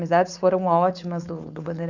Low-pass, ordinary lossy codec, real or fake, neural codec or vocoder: 7.2 kHz; none; fake; codec, 16 kHz, 4.8 kbps, FACodec